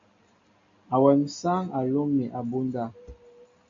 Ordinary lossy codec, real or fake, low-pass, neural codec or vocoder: MP3, 48 kbps; real; 7.2 kHz; none